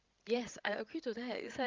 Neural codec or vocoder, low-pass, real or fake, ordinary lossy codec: codec, 16 kHz, 16 kbps, FreqCodec, larger model; 7.2 kHz; fake; Opus, 32 kbps